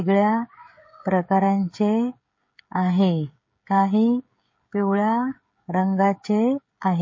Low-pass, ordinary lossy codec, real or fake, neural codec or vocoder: 7.2 kHz; MP3, 32 kbps; fake; codec, 16 kHz, 16 kbps, FreqCodec, smaller model